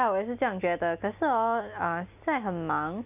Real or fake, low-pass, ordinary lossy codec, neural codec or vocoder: real; 3.6 kHz; none; none